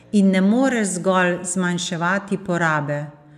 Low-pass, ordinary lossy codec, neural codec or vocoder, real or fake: 14.4 kHz; none; none; real